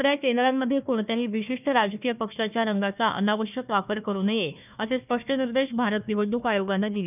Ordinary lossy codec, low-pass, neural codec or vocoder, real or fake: none; 3.6 kHz; codec, 16 kHz, 1 kbps, FunCodec, trained on Chinese and English, 50 frames a second; fake